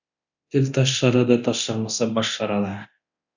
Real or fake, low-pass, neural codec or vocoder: fake; 7.2 kHz; codec, 24 kHz, 0.9 kbps, DualCodec